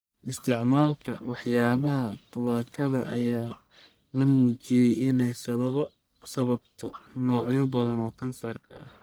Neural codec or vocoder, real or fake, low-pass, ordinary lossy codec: codec, 44.1 kHz, 1.7 kbps, Pupu-Codec; fake; none; none